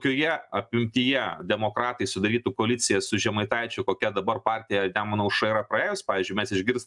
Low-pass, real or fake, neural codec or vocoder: 10.8 kHz; real; none